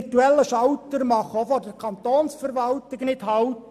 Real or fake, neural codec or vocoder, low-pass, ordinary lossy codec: real; none; 14.4 kHz; none